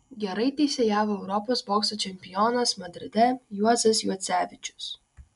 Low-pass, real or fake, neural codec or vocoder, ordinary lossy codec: 10.8 kHz; real; none; MP3, 96 kbps